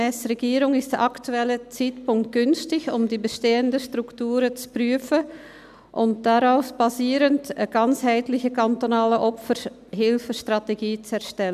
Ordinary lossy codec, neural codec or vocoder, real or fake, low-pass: none; none; real; 14.4 kHz